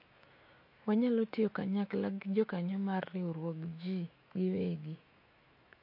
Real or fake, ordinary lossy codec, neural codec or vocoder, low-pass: fake; MP3, 32 kbps; autoencoder, 48 kHz, 128 numbers a frame, DAC-VAE, trained on Japanese speech; 5.4 kHz